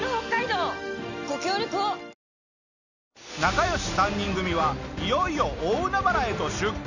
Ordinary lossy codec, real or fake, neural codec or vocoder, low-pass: none; real; none; 7.2 kHz